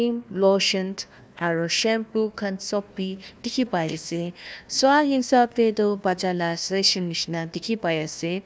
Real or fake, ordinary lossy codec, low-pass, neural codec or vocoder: fake; none; none; codec, 16 kHz, 1 kbps, FunCodec, trained on Chinese and English, 50 frames a second